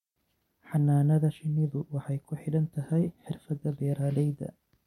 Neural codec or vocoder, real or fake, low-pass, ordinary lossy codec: none; real; 19.8 kHz; MP3, 64 kbps